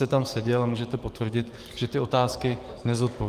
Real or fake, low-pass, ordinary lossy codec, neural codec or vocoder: fake; 14.4 kHz; Opus, 24 kbps; codec, 44.1 kHz, 7.8 kbps, DAC